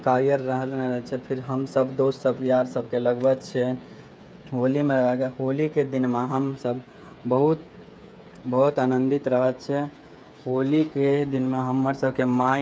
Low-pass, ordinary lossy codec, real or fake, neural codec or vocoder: none; none; fake; codec, 16 kHz, 8 kbps, FreqCodec, smaller model